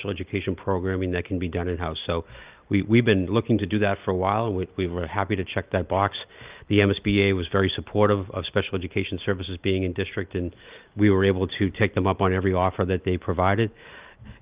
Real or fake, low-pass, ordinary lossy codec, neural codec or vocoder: real; 3.6 kHz; Opus, 32 kbps; none